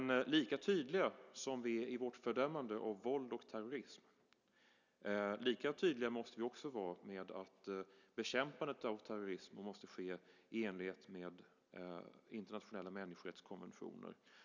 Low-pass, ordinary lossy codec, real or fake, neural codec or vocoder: 7.2 kHz; none; real; none